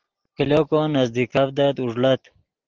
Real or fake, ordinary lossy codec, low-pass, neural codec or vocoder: real; Opus, 16 kbps; 7.2 kHz; none